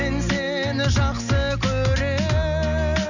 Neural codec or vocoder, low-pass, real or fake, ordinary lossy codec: none; 7.2 kHz; real; none